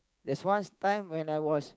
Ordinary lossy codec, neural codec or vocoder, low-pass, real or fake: none; codec, 16 kHz, 6 kbps, DAC; none; fake